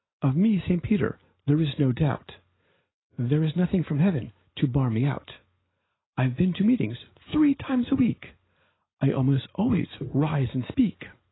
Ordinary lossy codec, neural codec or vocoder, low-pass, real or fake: AAC, 16 kbps; none; 7.2 kHz; real